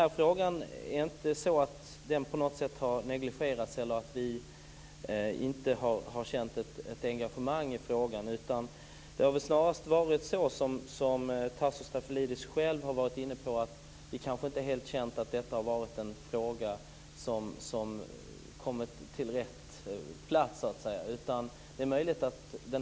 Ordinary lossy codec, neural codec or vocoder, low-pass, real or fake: none; none; none; real